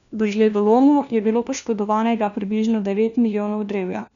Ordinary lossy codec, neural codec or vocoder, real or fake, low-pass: none; codec, 16 kHz, 1 kbps, FunCodec, trained on LibriTTS, 50 frames a second; fake; 7.2 kHz